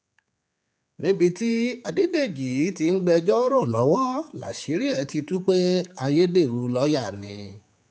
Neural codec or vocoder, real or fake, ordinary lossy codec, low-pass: codec, 16 kHz, 4 kbps, X-Codec, HuBERT features, trained on general audio; fake; none; none